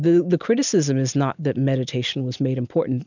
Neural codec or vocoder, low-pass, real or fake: none; 7.2 kHz; real